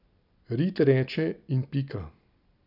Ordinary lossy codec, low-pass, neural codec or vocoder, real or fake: none; 5.4 kHz; none; real